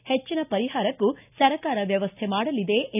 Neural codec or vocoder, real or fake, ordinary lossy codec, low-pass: none; real; none; 3.6 kHz